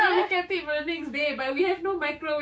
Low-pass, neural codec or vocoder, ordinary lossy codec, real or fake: none; codec, 16 kHz, 6 kbps, DAC; none; fake